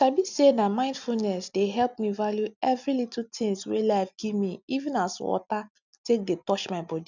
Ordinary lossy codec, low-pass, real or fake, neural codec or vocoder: none; 7.2 kHz; real; none